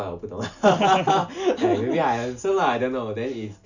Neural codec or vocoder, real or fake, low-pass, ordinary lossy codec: none; real; 7.2 kHz; none